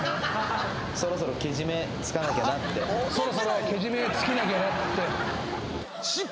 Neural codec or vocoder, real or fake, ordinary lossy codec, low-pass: none; real; none; none